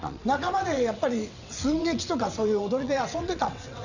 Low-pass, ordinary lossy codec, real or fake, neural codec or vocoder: 7.2 kHz; none; fake; vocoder, 22.05 kHz, 80 mel bands, WaveNeXt